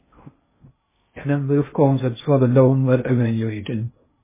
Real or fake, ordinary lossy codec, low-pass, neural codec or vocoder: fake; MP3, 16 kbps; 3.6 kHz; codec, 16 kHz in and 24 kHz out, 0.6 kbps, FocalCodec, streaming, 2048 codes